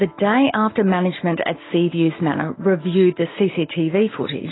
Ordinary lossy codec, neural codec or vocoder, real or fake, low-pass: AAC, 16 kbps; none; real; 7.2 kHz